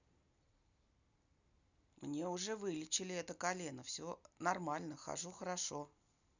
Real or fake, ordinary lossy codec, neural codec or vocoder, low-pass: real; none; none; 7.2 kHz